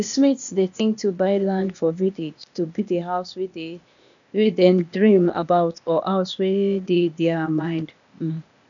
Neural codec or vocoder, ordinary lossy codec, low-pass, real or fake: codec, 16 kHz, 0.8 kbps, ZipCodec; none; 7.2 kHz; fake